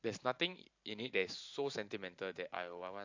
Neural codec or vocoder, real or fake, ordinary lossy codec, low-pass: vocoder, 44.1 kHz, 128 mel bands every 512 samples, BigVGAN v2; fake; AAC, 48 kbps; 7.2 kHz